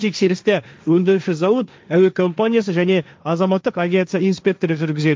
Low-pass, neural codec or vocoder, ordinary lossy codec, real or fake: none; codec, 16 kHz, 1.1 kbps, Voila-Tokenizer; none; fake